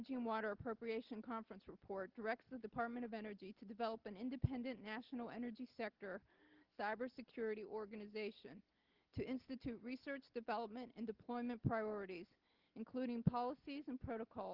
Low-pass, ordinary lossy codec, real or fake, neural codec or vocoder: 5.4 kHz; Opus, 16 kbps; real; none